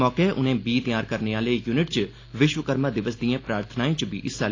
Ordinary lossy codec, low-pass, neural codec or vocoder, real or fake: AAC, 32 kbps; 7.2 kHz; none; real